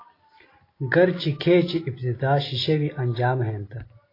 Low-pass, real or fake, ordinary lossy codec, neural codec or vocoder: 5.4 kHz; real; AAC, 24 kbps; none